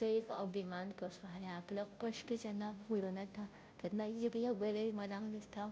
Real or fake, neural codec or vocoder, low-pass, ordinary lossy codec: fake; codec, 16 kHz, 0.5 kbps, FunCodec, trained on Chinese and English, 25 frames a second; none; none